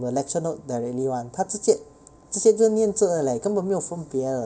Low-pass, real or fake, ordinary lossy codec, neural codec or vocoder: none; real; none; none